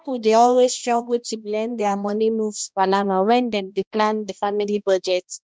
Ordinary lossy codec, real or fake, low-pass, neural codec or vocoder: none; fake; none; codec, 16 kHz, 1 kbps, X-Codec, HuBERT features, trained on balanced general audio